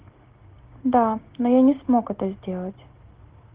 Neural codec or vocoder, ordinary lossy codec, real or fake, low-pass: none; Opus, 16 kbps; real; 3.6 kHz